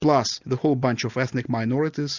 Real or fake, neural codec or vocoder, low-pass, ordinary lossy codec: real; none; 7.2 kHz; Opus, 64 kbps